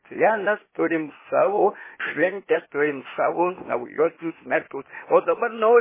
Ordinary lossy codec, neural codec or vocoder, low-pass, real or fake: MP3, 16 kbps; codec, 16 kHz, 0.8 kbps, ZipCodec; 3.6 kHz; fake